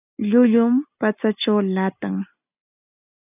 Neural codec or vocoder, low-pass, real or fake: none; 3.6 kHz; real